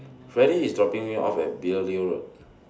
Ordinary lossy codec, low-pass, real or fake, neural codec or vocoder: none; none; real; none